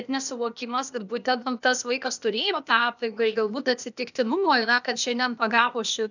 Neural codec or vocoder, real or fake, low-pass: codec, 16 kHz, 0.8 kbps, ZipCodec; fake; 7.2 kHz